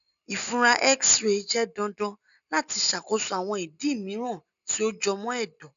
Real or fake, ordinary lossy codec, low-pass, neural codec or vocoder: real; none; 7.2 kHz; none